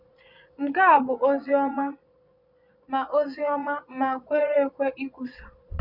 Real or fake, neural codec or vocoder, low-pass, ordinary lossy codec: fake; vocoder, 22.05 kHz, 80 mel bands, Vocos; 5.4 kHz; none